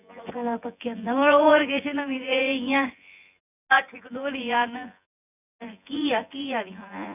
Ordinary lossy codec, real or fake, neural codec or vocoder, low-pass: none; fake; vocoder, 24 kHz, 100 mel bands, Vocos; 3.6 kHz